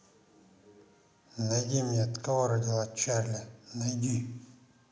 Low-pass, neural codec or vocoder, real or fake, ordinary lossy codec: none; none; real; none